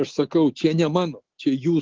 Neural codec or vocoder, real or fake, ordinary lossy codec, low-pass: none; real; Opus, 16 kbps; 7.2 kHz